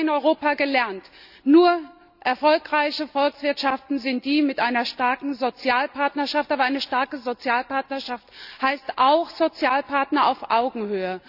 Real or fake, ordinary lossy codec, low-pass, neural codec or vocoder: real; none; 5.4 kHz; none